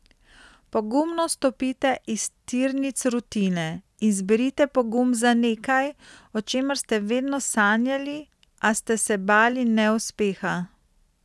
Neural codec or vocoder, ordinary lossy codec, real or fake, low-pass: none; none; real; none